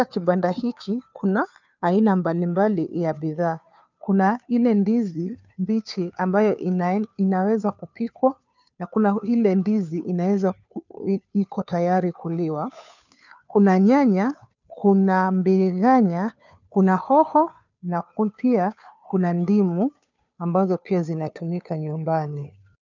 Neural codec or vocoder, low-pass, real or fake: codec, 16 kHz, 2 kbps, FunCodec, trained on Chinese and English, 25 frames a second; 7.2 kHz; fake